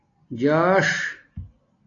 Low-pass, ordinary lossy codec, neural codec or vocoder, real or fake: 7.2 kHz; AAC, 32 kbps; none; real